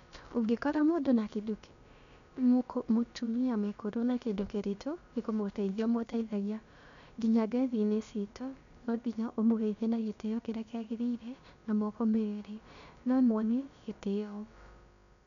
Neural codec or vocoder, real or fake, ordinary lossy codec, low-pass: codec, 16 kHz, about 1 kbps, DyCAST, with the encoder's durations; fake; none; 7.2 kHz